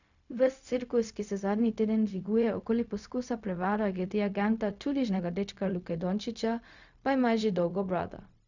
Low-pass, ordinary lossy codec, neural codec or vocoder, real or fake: 7.2 kHz; none; codec, 16 kHz, 0.4 kbps, LongCat-Audio-Codec; fake